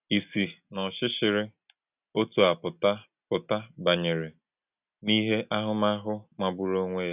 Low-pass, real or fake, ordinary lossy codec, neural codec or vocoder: 3.6 kHz; real; none; none